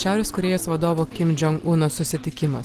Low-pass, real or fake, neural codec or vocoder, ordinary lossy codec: 14.4 kHz; real; none; Opus, 16 kbps